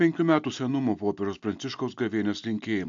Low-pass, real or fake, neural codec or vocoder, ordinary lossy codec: 7.2 kHz; real; none; AAC, 64 kbps